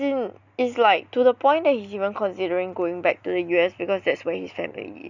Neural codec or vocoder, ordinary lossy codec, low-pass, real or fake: none; none; 7.2 kHz; real